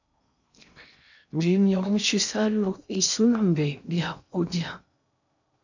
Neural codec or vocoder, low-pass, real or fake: codec, 16 kHz in and 24 kHz out, 0.6 kbps, FocalCodec, streaming, 2048 codes; 7.2 kHz; fake